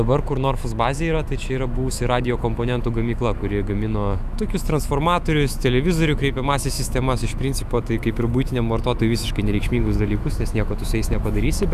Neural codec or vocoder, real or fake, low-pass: autoencoder, 48 kHz, 128 numbers a frame, DAC-VAE, trained on Japanese speech; fake; 14.4 kHz